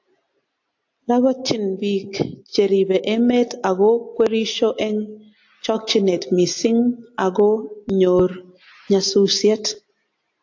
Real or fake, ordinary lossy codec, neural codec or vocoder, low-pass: real; AAC, 48 kbps; none; 7.2 kHz